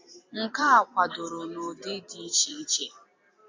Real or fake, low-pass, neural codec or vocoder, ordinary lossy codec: fake; 7.2 kHz; vocoder, 44.1 kHz, 128 mel bands every 256 samples, BigVGAN v2; MP3, 48 kbps